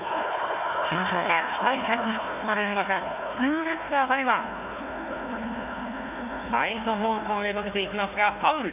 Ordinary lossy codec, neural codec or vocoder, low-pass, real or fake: none; codec, 16 kHz, 1 kbps, FunCodec, trained on Chinese and English, 50 frames a second; 3.6 kHz; fake